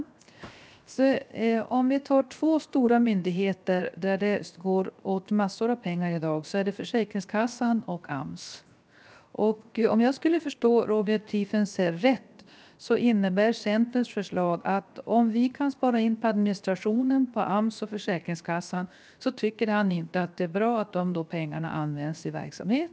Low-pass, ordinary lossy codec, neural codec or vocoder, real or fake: none; none; codec, 16 kHz, 0.7 kbps, FocalCodec; fake